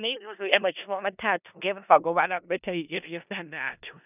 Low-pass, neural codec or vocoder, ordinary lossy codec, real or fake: 3.6 kHz; codec, 16 kHz in and 24 kHz out, 0.4 kbps, LongCat-Audio-Codec, four codebook decoder; none; fake